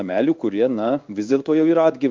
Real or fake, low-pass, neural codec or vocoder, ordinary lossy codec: fake; 7.2 kHz; codec, 16 kHz in and 24 kHz out, 1 kbps, XY-Tokenizer; Opus, 24 kbps